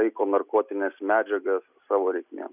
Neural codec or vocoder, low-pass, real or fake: none; 3.6 kHz; real